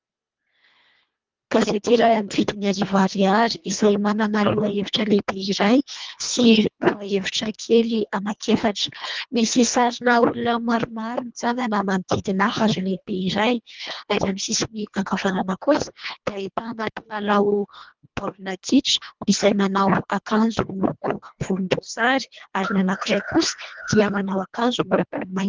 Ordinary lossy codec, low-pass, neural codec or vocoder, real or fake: Opus, 24 kbps; 7.2 kHz; codec, 24 kHz, 1.5 kbps, HILCodec; fake